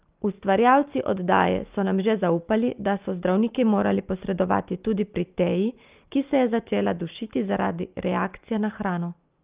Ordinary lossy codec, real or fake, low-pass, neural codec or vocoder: Opus, 32 kbps; real; 3.6 kHz; none